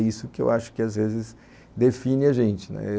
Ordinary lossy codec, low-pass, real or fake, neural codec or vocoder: none; none; real; none